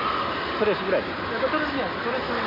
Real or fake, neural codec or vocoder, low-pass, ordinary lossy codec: real; none; 5.4 kHz; none